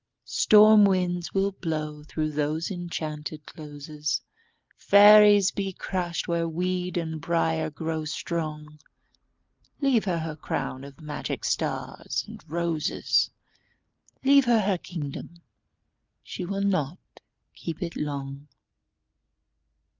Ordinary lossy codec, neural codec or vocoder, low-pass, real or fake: Opus, 24 kbps; none; 7.2 kHz; real